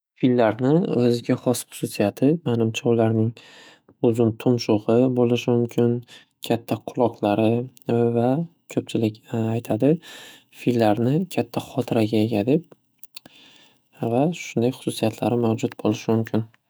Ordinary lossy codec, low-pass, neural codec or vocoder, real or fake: none; none; autoencoder, 48 kHz, 128 numbers a frame, DAC-VAE, trained on Japanese speech; fake